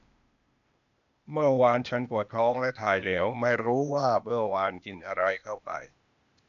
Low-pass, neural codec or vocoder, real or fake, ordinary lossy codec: 7.2 kHz; codec, 16 kHz, 0.8 kbps, ZipCodec; fake; none